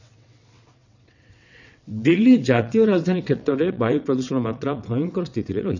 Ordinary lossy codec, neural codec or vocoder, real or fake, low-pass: none; vocoder, 22.05 kHz, 80 mel bands, WaveNeXt; fake; 7.2 kHz